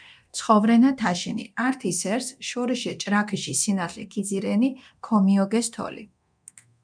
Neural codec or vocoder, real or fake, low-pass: codec, 24 kHz, 0.9 kbps, DualCodec; fake; 9.9 kHz